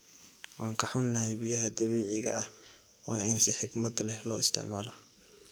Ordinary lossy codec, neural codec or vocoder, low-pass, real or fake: none; codec, 44.1 kHz, 2.6 kbps, SNAC; none; fake